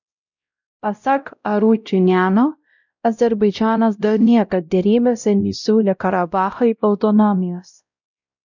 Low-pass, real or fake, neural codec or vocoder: 7.2 kHz; fake; codec, 16 kHz, 0.5 kbps, X-Codec, WavLM features, trained on Multilingual LibriSpeech